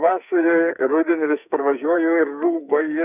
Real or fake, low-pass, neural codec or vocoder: fake; 3.6 kHz; codec, 44.1 kHz, 2.6 kbps, SNAC